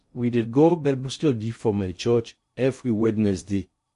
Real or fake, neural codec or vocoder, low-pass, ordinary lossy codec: fake; codec, 16 kHz in and 24 kHz out, 0.6 kbps, FocalCodec, streaming, 2048 codes; 10.8 kHz; MP3, 48 kbps